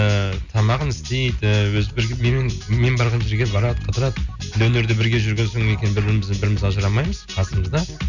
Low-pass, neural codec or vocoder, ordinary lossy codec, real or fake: 7.2 kHz; none; none; real